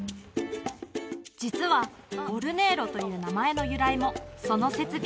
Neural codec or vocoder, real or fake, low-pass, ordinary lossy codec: none; real; none; none